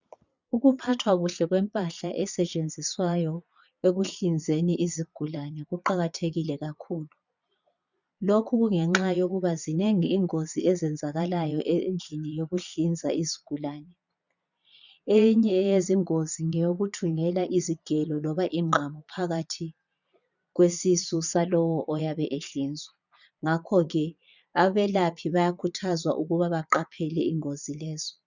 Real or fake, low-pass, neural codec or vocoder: fake; 7.2 kHz; vocoder, 22.05 kHz, 80 mel bands, Vocos